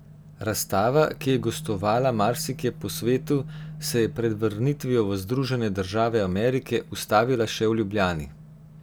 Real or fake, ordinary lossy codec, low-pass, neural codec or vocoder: real; none; none; none